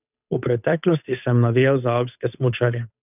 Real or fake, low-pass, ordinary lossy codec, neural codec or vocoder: fake; 3.6 kHz; none; codec, 16 kHz, 8 kbps, FunCodec, trained on Chinese and English, 25 frames a second